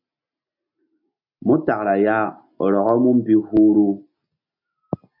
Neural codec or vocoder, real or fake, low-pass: none; real; 5.4 kHz